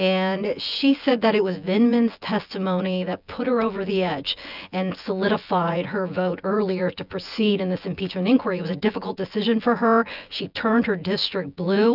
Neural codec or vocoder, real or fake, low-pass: vocoder, 24 kHz, 100 mel bands, Vocos; fake; 5.4 kHz